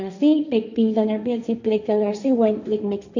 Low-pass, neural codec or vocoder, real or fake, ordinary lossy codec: 7.2 kHz; codec, 16 kHz, 1.1 kbps, Voila-Tokenizer; fake; none